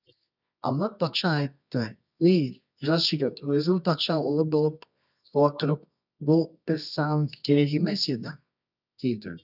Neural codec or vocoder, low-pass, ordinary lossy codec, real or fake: codec, 24 kHz, 0.9 kbps, WavTokenizer, medium music audio release; 5.4 kHz; none; fake